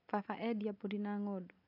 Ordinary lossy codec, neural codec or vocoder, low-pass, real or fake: AAC, 32 kbps; none; 5.4 kHz; real